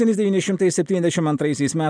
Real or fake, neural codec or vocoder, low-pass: real; none; 9.9 kHz